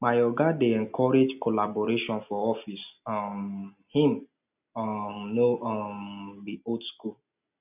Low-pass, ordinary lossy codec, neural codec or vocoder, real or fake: 3.6 kHz; none; none; real